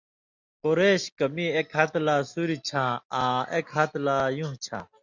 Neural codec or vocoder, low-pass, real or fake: none; 7.2 kHz; real